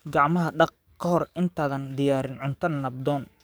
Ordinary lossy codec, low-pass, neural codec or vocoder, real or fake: none; none; codec, 44.1 kHz, 7.8 kbps, DAC; fake